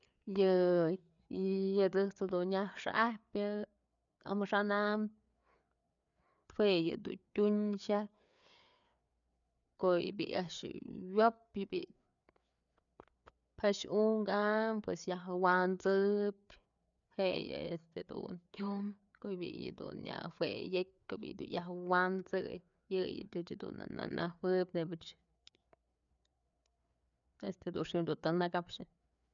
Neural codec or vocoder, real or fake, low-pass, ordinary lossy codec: codec, 16 kHz, 4 kbps, FreqCodec, larger model; fake; 7.2 kHz; none